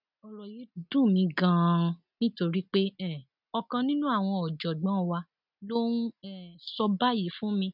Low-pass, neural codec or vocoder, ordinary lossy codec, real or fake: 5.4 kHz; none; none; real